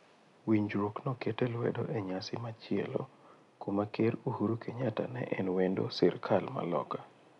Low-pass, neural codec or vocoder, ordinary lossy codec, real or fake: 10.8 kHz; none; none; real